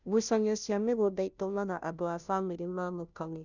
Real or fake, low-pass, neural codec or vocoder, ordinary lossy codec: fake; 7.2 kHz; codec, 16 kHz, 0.5 kbps, FunCodec, trained on Chinese and English, 25 frames a second; none